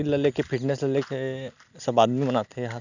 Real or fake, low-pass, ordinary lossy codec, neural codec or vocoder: real; 7.2 kHz; none; none